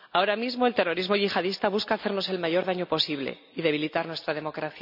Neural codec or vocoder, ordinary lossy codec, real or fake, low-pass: none; none; real; 5.4 kHz